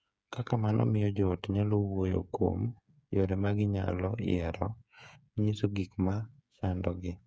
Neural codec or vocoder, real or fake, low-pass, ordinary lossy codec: codec, 16 kHz, 8 kbps, FreqCodec, smaller model; fake; none; none